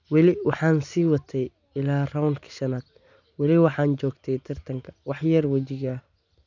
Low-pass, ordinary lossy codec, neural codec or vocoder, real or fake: 7.2 kHz; none; none; real